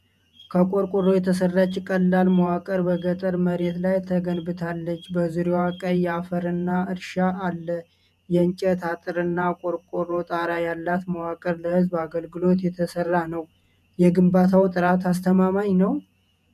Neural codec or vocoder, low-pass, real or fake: vocoder, 48 kHz, 128 mel bands, Vocos; 14.4 kHz; fake